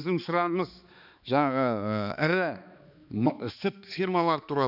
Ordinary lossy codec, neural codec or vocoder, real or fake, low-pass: none; codec, 16 kHz, 2 kbps, X-Codec, HuBERT features, trained on balanced general audio; fake; 5.4 kHz